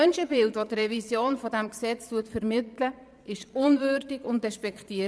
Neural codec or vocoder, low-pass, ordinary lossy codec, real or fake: vocoder, 22.05 kHz, 80 mel bands, Vocos; none; none; fake